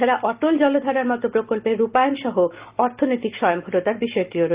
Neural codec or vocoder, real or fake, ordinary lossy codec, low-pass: none; real; Opus, 32 kbps; 3.6 kHz